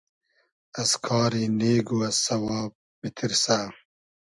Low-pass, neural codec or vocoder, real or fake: 10.8 kHz; none; real